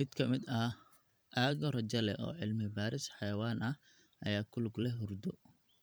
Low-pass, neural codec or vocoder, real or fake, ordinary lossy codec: none; vocoder, 44.1 kHz, 128 mel bands every 512 samples, BigVGAN v2; fake; none